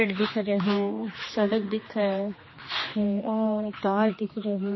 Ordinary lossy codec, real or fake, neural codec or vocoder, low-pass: MP3, 24 kbps; fake; codec, 16 kHz, 2 kbps, X-Codec, HuBERT features, trained on general audio; 7.2 kHz